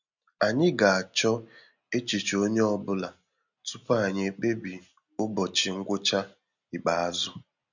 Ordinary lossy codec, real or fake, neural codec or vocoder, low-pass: none; real; none; 7.2 kHz